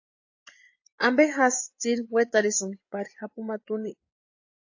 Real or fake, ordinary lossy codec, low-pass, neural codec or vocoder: fake; AAC, 48 kbps; 7.2 kHz; vocoder, 24 kHz, 100 mel bands, Vocos